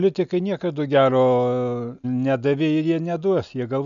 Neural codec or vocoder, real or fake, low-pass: none; real; 7.2 kHz